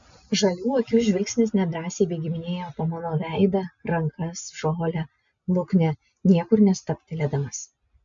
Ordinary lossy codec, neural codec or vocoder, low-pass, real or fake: MP3, 64 kbps; none; 7.2 kHz; real